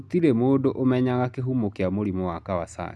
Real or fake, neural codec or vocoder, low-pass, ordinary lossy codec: real; none; none; none